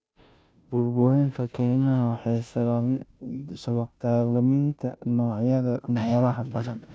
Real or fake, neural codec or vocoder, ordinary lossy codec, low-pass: fake; codec, 16 kHz, 0.5 kbps, FunCodec, trained on Chinese and English, 25 frames a second; none; none